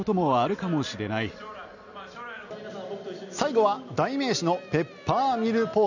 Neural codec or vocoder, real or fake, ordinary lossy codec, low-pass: none; real; none; 7.2 kHz